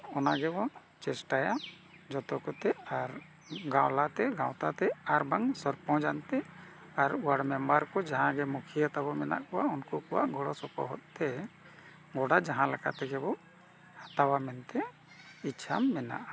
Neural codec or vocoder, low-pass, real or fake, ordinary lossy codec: none; none; real; none